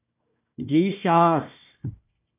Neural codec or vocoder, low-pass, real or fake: codec, 16 kHz, 1 kbps, FunCodec, trained on Chinese and English, 50 frames a second; 3.6 kHz; fake